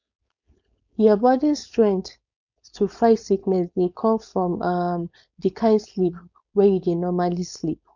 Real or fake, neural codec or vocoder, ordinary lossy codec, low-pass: fake; codec, 16 kHz, 4.8 kbps, FACodec; none; 7.2 kHz